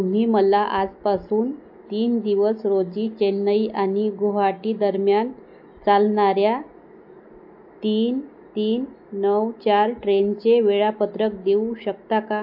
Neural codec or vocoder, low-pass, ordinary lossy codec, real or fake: none; 5.4 kHz; AAC, 48 kbps; real